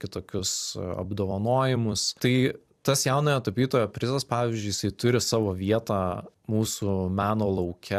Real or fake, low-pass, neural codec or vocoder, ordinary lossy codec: fake; 14.4 kHz; vocoder, 44.1 kHz, 128 mel bands every 256 samples, BigVGAN v2; AAC, 96 kbps